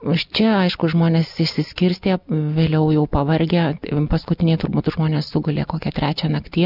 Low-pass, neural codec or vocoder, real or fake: 5.4 kHz; none; real